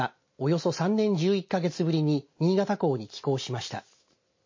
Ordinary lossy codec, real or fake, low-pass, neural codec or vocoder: MP3, 32 kbps; real; 7.2 kHz; none